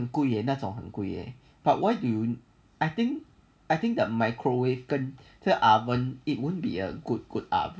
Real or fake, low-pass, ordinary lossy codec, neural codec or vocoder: real; none; none; none